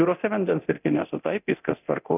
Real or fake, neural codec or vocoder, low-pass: fake; codec, 24 kHz, 0.9 kbps, DualCodec; 3.6 kHz